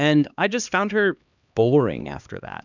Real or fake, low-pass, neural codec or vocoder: fake; 7.2 kHz; codec, 16 kHz, 2 kbps, X-Codec, HuBERT features, trained on LibriSpeech